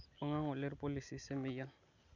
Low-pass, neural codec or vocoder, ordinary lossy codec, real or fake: 7.2 kHz; none; none; real